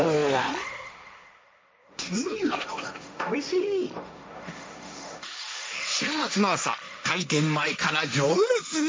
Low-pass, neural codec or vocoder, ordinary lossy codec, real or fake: none; codec, 16 kHz, 1.1 kbps, Voila-Tokenizer; none; fake